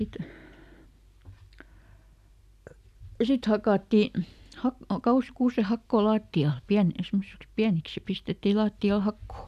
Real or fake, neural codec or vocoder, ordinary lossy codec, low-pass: real; none; none; 14.4 kHz